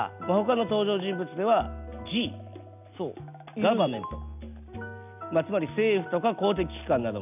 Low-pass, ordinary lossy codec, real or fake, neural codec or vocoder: 3.6 kHz; none; real; none